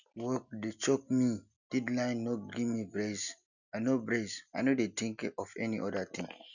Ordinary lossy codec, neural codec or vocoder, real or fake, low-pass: none; none; real; 7.2 kHz